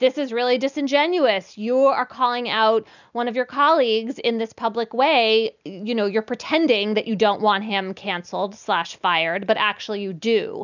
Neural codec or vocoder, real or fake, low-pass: none; real; 7.2 kHz